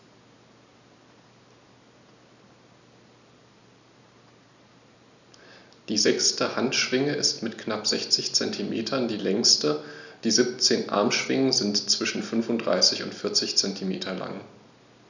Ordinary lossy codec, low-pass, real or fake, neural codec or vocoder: none; 7.2 kHz; real; none